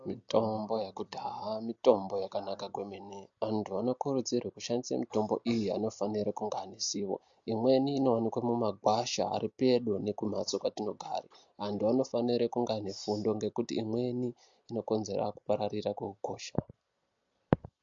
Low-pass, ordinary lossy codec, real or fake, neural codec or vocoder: 7.2 kHz; MP3, 48 kbps; real; none